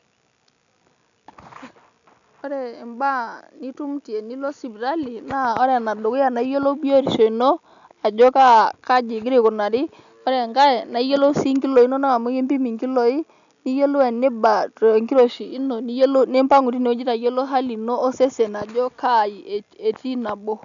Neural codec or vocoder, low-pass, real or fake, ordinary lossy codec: none; 7.2 kHz; real; none